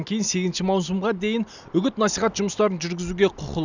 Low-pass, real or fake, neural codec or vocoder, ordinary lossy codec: 7.2 kHz; real; none; none